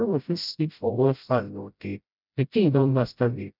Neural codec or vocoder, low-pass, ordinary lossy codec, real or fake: codec, 16 kHz, 0.5 kbps, FreqCodec, smaller model; 5.4 kHz; none; fake